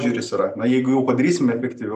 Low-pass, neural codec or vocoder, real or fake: 14.4 kHz; none; real